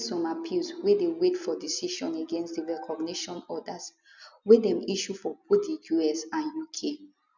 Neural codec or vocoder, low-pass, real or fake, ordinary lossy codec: none; 7.2 kHz; real; none